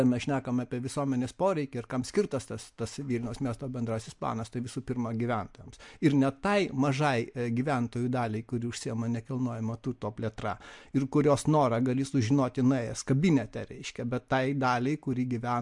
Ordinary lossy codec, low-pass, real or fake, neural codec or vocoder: MP3, 64 kbps; 10.8 kHz; real; none